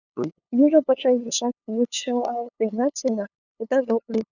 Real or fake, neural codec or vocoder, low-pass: fake; codec, 16 kHz, 2 kbps, FunCodec, trained on LibriTTS, 25 frames a second; 7.2 kHz